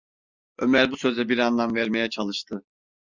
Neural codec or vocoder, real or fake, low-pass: none; real; 7.2 kHz